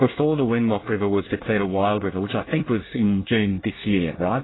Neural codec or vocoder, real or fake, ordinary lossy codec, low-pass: codec, 24 kHz, 1 kbps, SNAC; fake; AAC, 16 kbps; 7.2 kHz